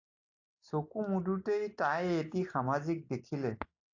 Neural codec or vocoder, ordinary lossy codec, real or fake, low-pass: none; AAC, 48 kbps; real; 7.2 kHz